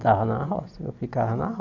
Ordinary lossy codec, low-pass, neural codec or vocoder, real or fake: none; 7.2 kHz; none; real